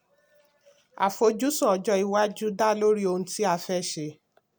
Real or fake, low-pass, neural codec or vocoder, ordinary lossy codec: real; none; none; none